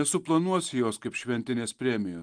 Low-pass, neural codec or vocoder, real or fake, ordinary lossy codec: 9.9 kHz; none; real; Opus, 32 kbps